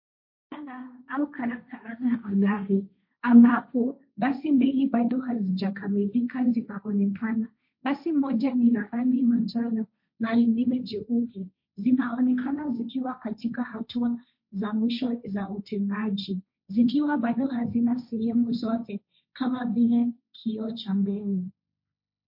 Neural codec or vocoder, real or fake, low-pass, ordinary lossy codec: codec, 16 kHz, 1.1 kbps, Voila-Tokenizer; fake; 5.4 kHz; MP3, 32 kbps